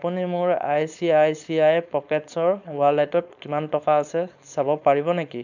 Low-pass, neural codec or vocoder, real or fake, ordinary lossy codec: 7.2 kHz; codec, 16 kHz, 4.8 kbps, FACodec; fake; none